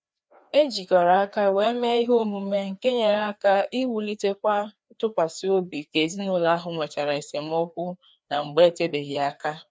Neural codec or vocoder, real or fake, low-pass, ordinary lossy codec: codec, 16 kHz, 2 kbps, FreqCodec, larger model; fake; none; none